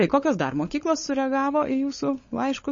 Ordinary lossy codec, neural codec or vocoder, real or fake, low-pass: MP3, 32 kbps; codec, 16 kHz, 16 kbps, FunCodec, trained on LibriTTS, 50 frames a second; fake; 7.2 kHz